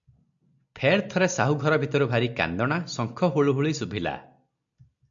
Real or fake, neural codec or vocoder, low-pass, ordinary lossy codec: real; none; 7.2 kHz; AAC, 64 kbps